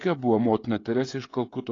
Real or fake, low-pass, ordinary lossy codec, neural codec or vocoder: fake; 7.2 kHz; AAC, 32 kbps; codec, 16 kHz, 16 kbps, FreqCodec, smaller model